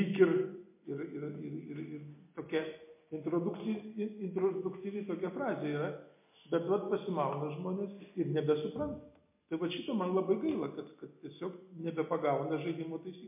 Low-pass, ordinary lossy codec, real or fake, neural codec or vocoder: 3.6 kHz; MP3, 24 kbps; real; none